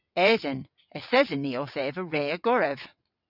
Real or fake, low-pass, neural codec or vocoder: fake; 5.4 kHz; vocoder, 22.05 kHz, 80 mel bands, WaveNeXt